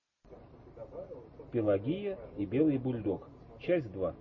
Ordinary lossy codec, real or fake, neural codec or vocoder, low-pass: MP3, 32 kbps; real; none; 7.2 kHz